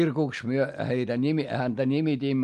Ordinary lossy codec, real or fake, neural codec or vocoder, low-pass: Opus, 32 kbps; real; none; 14.4 kHz